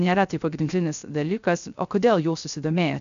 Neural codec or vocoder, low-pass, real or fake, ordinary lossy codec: codec, 16 kHz, 0.3 kbps, FocalCodec; 7.2 kHz; fake; MP3, 96 kbps